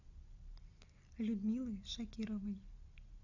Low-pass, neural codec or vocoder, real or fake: 7.2 kHz; none; real